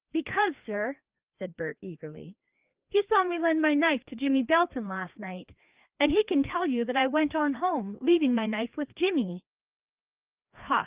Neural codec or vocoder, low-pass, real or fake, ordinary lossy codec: codec, 16 kHz, 2 kbps, FreqCodec, larger model; 3.6 kHz; fake; Opus, 32 kbps